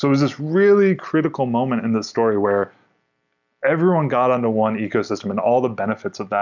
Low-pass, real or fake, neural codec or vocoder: 7.2 kHz; real; none